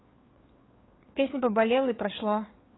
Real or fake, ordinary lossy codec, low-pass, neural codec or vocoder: fake; AAC, 16 kbps; 7.2 kHz; codec, 16 kHz, 4 kbps, FreqCodec, larger model